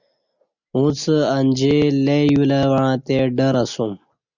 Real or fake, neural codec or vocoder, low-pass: real; none; 7.2 kHz